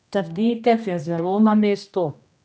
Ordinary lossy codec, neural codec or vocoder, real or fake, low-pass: none; codec, 16 kHz, 1 kbps, X-Codec, HuBERT features, trained on general audio; fake; none